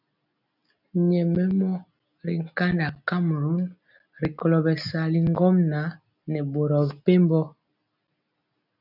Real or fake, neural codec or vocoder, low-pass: real; none; 5.4 kHz